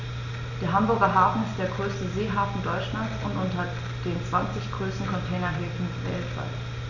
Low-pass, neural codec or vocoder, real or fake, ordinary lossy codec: 7.2 kHz; none; real; none